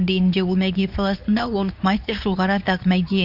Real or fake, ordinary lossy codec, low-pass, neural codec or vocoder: fake; none; 5.4 kHz; codec, 24 kHz, 0.9 kbps, WavTokenizer, medium speech release version 2